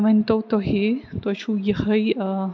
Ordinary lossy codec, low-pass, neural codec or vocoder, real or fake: none; none; none; real